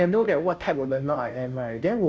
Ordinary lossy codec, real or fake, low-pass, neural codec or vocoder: none; fake; none; codec, 16 kHz, 0.5 kbps, FunCodec, trained on Chinese and English, 25 frames a second